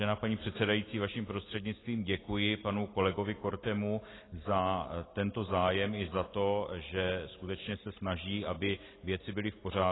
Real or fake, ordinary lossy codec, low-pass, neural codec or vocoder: real; AAC, 16 kbps; 7.2 kHz; none